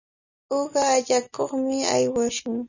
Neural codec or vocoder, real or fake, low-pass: none; real; 7.2 kHz